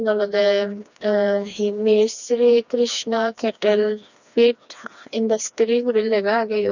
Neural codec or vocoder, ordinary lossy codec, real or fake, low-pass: codec, 16 kHz, 2 kbps, FreqCodec, smaller model; none; fake; 7.2 kHz